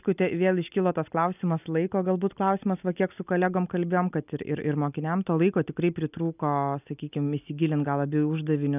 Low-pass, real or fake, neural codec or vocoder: 3.6 kHz; real; none